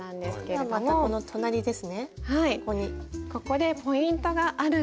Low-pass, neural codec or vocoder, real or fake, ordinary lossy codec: none; none; real; none